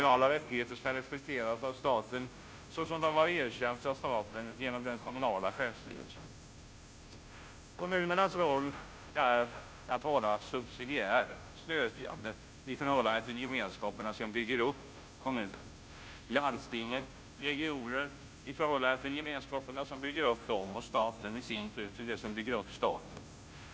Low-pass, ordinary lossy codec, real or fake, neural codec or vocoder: none; none; fake; codec, 16 kHz, 0.5 kbps, FunCodec, trained on Chinese and English, 25 frames a second